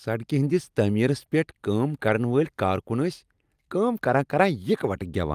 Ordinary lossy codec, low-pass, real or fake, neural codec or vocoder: Opus, 32 kbps; 14.4 kHz; real; none